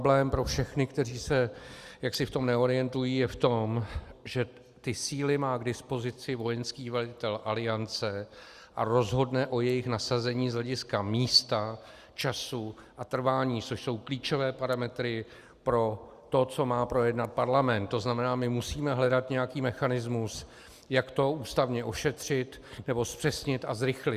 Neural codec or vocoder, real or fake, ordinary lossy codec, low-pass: none; real; Opus, 64 kbps; 14.4 kHz